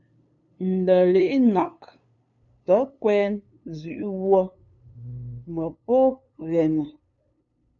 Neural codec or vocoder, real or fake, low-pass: codec, 16 kHz, 2 kbps, FunCodec, trained on LibriTTS, 25 frames a second; fake; 7.2 kHz